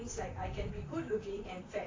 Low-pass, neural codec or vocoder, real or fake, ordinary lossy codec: 7.2 kHz; vocoder, 44.1 kHz, 128 mel bands, Pupu-Vocoder; fake; AAC, 32 kbps